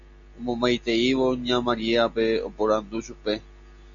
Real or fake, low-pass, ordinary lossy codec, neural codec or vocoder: real; 7.2 kHz; AAC, 64 kbps; none